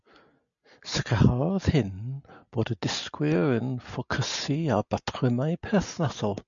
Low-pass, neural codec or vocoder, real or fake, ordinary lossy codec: 7.2 kHz; none; real; MP3, 96 kbps